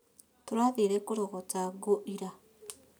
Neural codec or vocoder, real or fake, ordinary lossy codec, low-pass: none; real; none; none